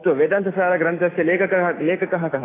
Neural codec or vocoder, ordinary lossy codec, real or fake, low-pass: autoencoder, 48 kHz, 128 numbers a frame, DAC-VAE, trained on Japanese speech; AAC, 16 kbps; fake; 3.6 kHz